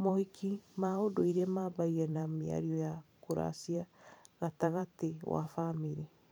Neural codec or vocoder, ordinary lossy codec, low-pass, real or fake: vocoder, 44.1 kHz, 128 mel bands every 512 samples, BigVGAN v2; none; none; fake